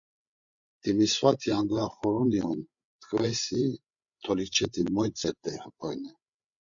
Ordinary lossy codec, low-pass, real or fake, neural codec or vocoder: Opus, 64 kbps; 7.2 kHz; fake; codec, 16 kHz, 8 kbps, FreqCodec, larger model